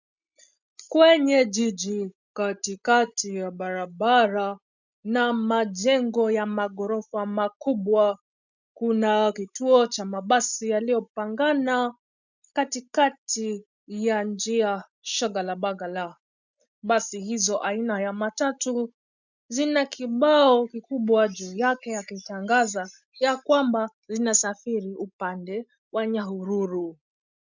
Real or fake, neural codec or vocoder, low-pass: real; none; 7.2 kHz